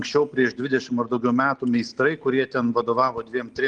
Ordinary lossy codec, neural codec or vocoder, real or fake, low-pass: Opus, 24 kbps; none; real; 9.9 kHz